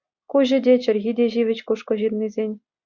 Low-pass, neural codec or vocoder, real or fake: 7.2 kHz; none; real